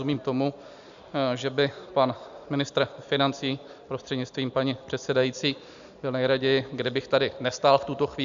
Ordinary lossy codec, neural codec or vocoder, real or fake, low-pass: AAC, 96 kbps; none; real; 7.2 kHz